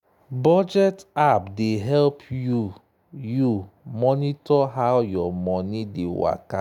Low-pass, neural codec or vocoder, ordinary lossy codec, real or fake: 19.8 kHz; none; none; real